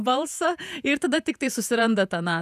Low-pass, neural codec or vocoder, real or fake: 14.4 kHz; vocoder, 48 kHz, 128 mel bands, Vocos; fake